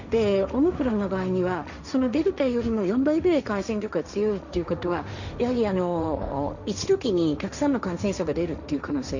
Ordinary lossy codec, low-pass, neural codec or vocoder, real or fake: none; 7.2 kHz; codec, 16 kHz, 1.1 kbps, Voila-Tokenizer; fake